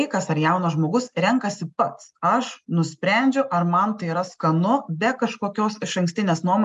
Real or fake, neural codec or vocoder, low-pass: real; none; 10.8 kHz